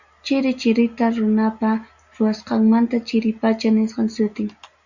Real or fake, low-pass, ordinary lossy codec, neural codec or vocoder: real; 7.2 kHz; Opus, 64 kbps; none